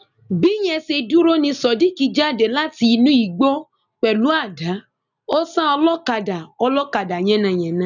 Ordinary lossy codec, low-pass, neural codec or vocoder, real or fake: none; 7.2 kHz; none; real